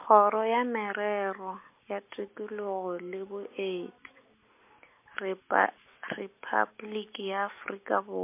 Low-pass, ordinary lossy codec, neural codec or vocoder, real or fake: 3.6 kHz; MP3, 24 kbps; none; real